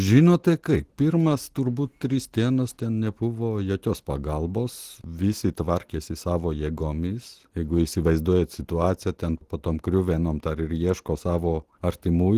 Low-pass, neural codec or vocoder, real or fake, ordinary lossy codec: 14.4 kHz; none; real; Opus, 16 kbps